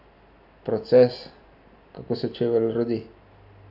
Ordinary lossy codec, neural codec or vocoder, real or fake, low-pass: none; none; real; 5.4 kHz